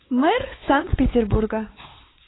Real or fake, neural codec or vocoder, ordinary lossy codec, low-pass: fake; codec, 16 kHz, 4 kbps, X-Codec, WavLM features, trained on Multilingual LibriSpeech; AAC, 16 kbps; 7.2 kHz